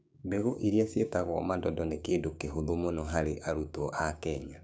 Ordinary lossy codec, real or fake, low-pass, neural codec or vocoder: none; fake; none; codec, 16 kHz, 6 kbps, DAC